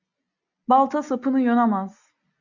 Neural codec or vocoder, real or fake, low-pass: none; real; 7.2 kHz